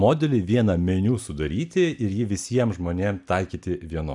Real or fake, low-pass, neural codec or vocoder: real; 10.8 kHz; none